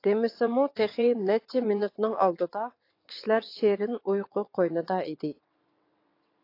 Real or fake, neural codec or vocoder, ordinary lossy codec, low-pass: fake; vocoder, 22.05 kHz, 80 mel bands, WaveNeXt; AAC, 32 kbps; 5.4 kHz